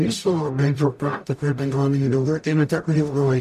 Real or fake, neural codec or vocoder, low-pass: fake; codec, 44.1 kHz, 0.9 kbps, DAC; 14.4 kHz